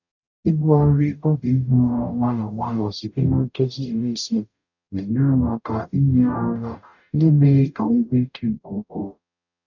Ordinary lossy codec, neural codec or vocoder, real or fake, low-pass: none; codec, 44.1 kHz, 0.9 kbps, DAC; fake; 7.2 kHz